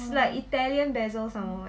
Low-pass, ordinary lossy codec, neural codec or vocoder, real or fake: none; none; none; real